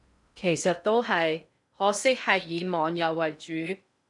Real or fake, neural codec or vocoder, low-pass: fake; codec, 16 kHz in and 24 kHz out, 0.6 kbps, FocalCodec, streaming, 4096 codes; 10.8 kHz